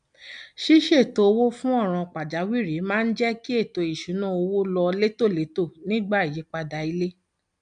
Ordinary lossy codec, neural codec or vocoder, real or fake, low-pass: none; none; real; 9.9 kHz